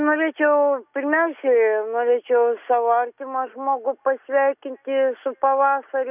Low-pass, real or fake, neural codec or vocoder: 3.6 kHz; real; none